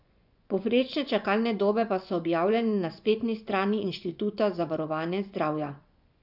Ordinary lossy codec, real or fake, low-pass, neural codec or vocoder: none; fake; 5.4 kHz; vocoder, 24 kHz, 100 mel bands, Vocos